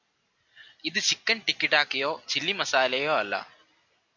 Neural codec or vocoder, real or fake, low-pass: none; real; 7.2 kHz